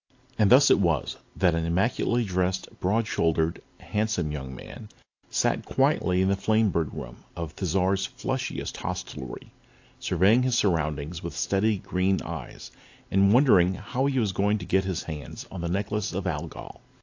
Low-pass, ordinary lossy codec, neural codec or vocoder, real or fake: 7.2 kHz; AAC, 48 kbps; none; real